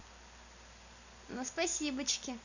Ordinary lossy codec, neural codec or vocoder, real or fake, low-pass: none; none; real; 7.2 kHz